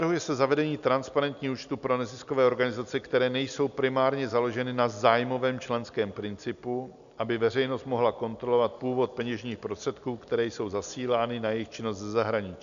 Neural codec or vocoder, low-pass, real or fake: none; 7.2 kHz; real